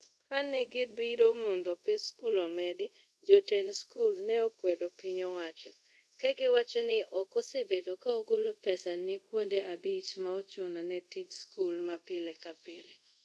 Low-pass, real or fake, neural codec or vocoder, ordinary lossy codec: none; fake; codec, 24 kHz, 0.5 kbps, DualCodec; none